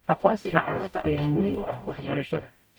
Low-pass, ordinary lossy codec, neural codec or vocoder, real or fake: none; none; codec, 44.1 kHz, 0.9 kbps, DAC; fake